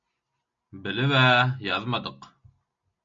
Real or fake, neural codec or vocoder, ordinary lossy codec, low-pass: real; none; MP3, 48 kbps; 7.2 kHz